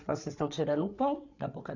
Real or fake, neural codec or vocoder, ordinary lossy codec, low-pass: fake; codec, 16 kHz, 8 kbps, FreqCodec, larger model; none; 7.2 kHz